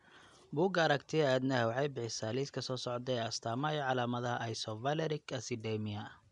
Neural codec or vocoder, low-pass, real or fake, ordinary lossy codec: none; 10.8 kHz; real; none